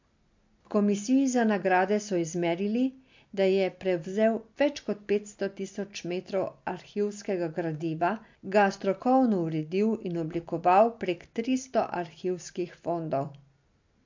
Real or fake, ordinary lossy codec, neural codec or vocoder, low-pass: real; MP3, 48 kbps; none; 7.2 kHz